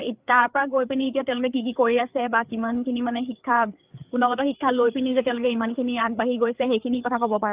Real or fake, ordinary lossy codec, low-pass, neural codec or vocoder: fake; Opus, 24 kbps; 3.6 kHz; codec, 16 kHz, 4 kbps, FreqCodec, larger model